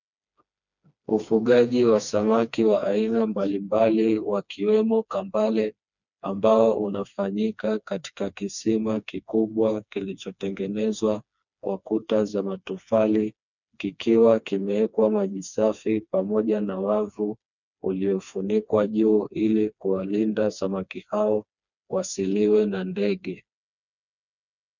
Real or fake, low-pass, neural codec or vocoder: fake; 7.2 kHz; codec, 16 kHz, 2 kbps, FreqCodec, smaller model